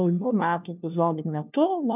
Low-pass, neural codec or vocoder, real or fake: 3.6 kHz; codec, 16 kHz, 1 kbps, FunCodec, trained on LibriTTS, 50 frames a second; fake